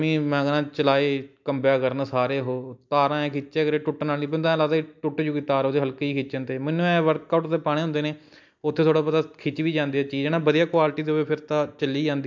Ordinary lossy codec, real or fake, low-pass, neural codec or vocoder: MP3, 64 kbps; real; 7.2 kHz; none